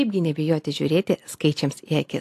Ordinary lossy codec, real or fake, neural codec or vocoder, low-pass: AAC, 64 kbps; real; none; 14.4 kHz